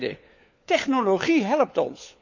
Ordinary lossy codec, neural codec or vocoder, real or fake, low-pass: none; codec, 16 kHz, 4 kbps, FunCodec, trained on LibriTTS, 50 frames a second; fake; 7.2 kHz